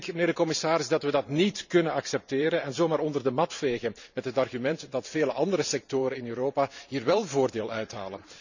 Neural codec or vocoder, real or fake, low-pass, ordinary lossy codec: vocoder, 44.1 kHz, 128 mel bands every 512 samples, BigVGAN v2; fake; 7.2 kHz; none